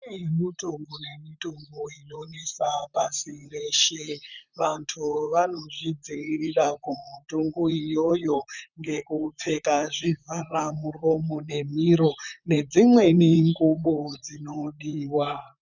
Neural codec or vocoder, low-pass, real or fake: vocoder, 44.1 kHz, 128 mel bands, Pupu-Vocoder; 7.2 kHz; fake